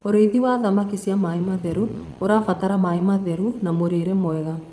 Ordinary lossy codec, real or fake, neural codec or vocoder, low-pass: none; fake; vocoder, 22.05 kHz, 80 mel bands, Vocos; none